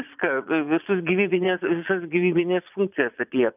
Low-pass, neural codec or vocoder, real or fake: 3.6 kHz; vocoder, 22.05 kHz, 80 mel bands, Vocos; fake